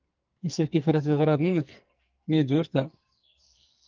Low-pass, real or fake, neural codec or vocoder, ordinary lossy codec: 7.2 kHz; fake; codec, 44.1 kHz, 2.6 kbps, SNAC; Opus, 24 kbps